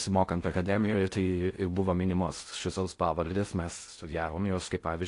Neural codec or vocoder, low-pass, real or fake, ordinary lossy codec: codec, 16 kHz in and 24 kHz out, 0.6 kbps, FocalCodec, streaming, 4096 codes; 10.8 kHz; fake; AAC, 48 kbps